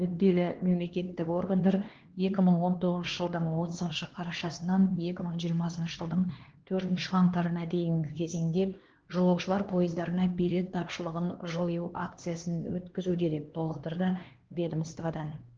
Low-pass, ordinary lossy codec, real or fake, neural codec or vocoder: 7.2 kHz; Opus, 16 kbps; fake; codec, 16 kHz, 2 kbps, X-Codec, HuBERT features, trained on LibriSpeech